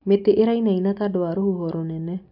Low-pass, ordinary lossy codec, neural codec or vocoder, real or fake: 5.4 kHz; AAC, 48 kbps; none; real